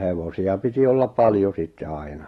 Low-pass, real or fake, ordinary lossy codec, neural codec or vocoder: 19.8 kHz; real; AAC, 32 kbps; none